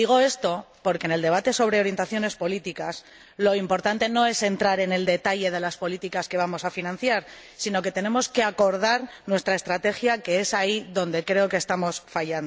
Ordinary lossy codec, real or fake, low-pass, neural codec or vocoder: none; real; none; none